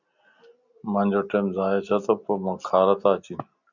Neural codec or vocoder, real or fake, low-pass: none; real; 7.2 kHz